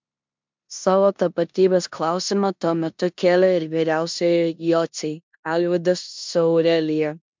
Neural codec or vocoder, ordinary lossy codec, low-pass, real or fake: codec, 16 kHz in and 24 kHz out, 0.9 kbps, LongCat-Audio-Codec, four codebook decoder; MP3, 64 kbps; 7.2 kHz; fake